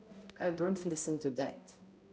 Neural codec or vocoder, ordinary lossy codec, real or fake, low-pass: codec, 16 kHz, 0.5 kbps, X-Codec, HuBERT features, trained on balanced general audio; none; fake; none